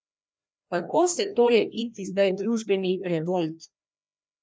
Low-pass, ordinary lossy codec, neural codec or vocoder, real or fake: none; none; codec, 16 kHz, 1 kbps, FreqCodec, larger model; fake